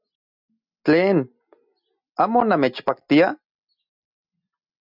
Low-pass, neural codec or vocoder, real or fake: 5.4 kHz; none; real